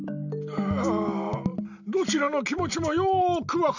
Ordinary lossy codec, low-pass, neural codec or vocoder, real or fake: none; 7.2 kHz; none; real